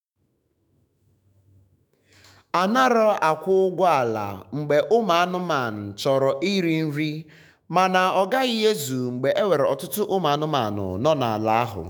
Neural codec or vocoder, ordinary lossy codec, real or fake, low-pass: autoencoder, 48 kHz, 128 numbers a frame, DAC-VAE, trained on Japanese speech; none; fake; none